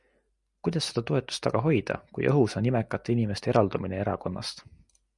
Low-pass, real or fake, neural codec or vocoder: 10.8 kHz; real; none